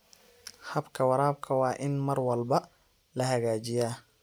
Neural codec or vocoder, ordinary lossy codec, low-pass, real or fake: none; none; none; real